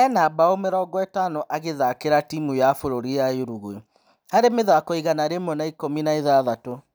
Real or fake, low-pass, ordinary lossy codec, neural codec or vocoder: real; none; none; none